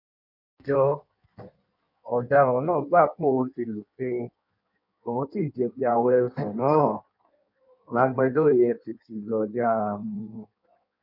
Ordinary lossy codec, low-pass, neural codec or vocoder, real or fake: none; 5.4 kHz; codec, 16 kHz in and 24 kHz out, 1.1 kbps, FireRedTTS-2 codec; fake